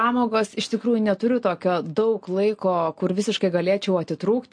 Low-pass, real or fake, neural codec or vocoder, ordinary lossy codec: 9.9 kHz; real; none; MP3, 48 kbps